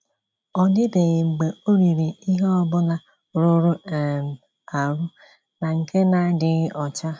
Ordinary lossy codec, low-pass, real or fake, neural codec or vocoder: none; none; real; none